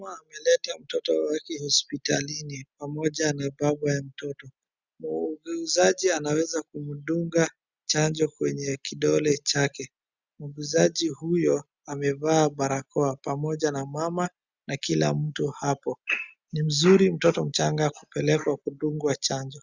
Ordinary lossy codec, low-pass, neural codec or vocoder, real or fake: Opus, 64 kbps; 7.2 kHz; none; real